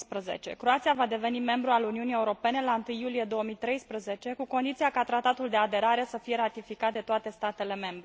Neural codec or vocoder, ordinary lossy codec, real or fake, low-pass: none; none; real; none